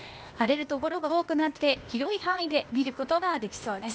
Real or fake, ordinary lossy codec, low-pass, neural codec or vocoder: fake; none; none; codec, 16 kHz, 0.8 kbps, ZipCodec